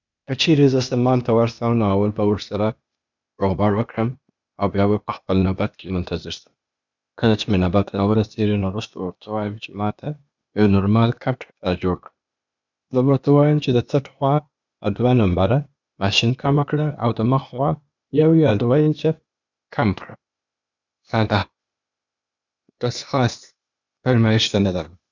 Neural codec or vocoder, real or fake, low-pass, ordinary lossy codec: codec, 16 kHz, 0.8 kbps, ZipCodec; fake; 7.2 kHz; none